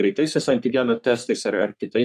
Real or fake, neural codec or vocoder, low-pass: fake; codec, 44.1 kHz, 3.4 kbps, Pupu-Codec; 14.4 kHz